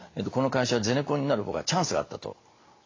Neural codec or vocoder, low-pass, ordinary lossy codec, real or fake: none; 7.2 kHz; AAC, 32 kbps; real